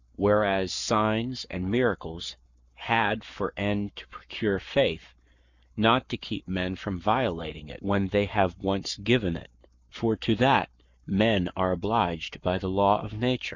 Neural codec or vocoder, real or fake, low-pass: codec, 44.1 kHz, 7.8 kbps, Pupu-Codec; fake; 7.2 kHz